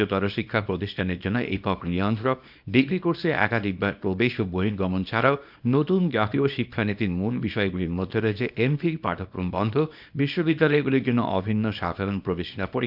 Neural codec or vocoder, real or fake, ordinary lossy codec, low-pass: codec, 24 kHz, 0.9 kbps, WavTokenizer, small release; fake; none; 5.4 kHz